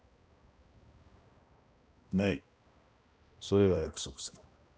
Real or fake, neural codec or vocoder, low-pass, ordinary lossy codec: fake; codec, 16 kHz, 1 kbps, X-Codec, HuBERT features, trained on balanced general audio; none; none